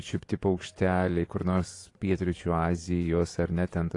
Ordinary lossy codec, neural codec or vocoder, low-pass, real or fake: AAC, 48 kbps; vocoder, 24 kHz, 100 mel bands, Vocos; 10.8 kHz; fake